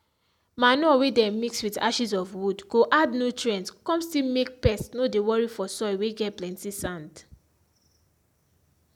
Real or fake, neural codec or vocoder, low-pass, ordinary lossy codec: real; none; 19.8 kHz; none